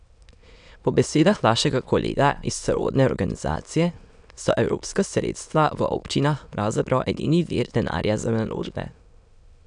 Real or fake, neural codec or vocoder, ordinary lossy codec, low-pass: fake; autoencoder, 22.05 kHz, a latent of 192 numbers a frame, VITS, trained on many speakers; none; 9.9 kHz